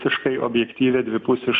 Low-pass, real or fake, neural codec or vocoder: 7.2 kHz; real; none